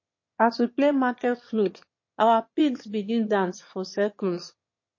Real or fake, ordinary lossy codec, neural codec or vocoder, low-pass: fake; MP3, 32 kbps; autoencoder, 22.05 kHz, a latent of 192 numbers a frame, VITS, trained on one speaker; 7.2 kHz